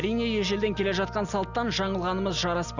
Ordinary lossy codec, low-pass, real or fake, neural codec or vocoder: none; 7.2 kHz; real; none